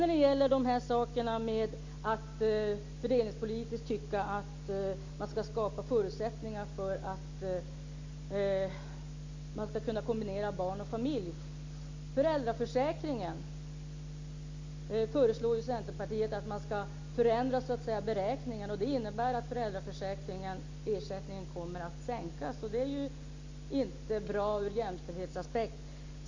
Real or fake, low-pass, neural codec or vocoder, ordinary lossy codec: real; 7.2 kHz; none; MP3, 64 kbps